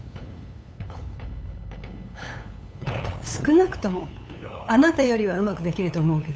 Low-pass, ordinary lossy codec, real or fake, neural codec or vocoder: none; none; fake; codec, 16 kHz, 8 kbps, FunCodec, trained on LibriTTS, 25 frames a second